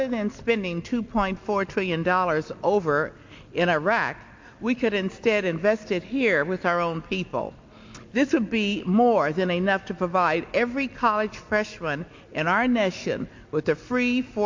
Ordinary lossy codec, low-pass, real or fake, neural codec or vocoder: MP3, 48 kbps; 7.2 kHz; real; none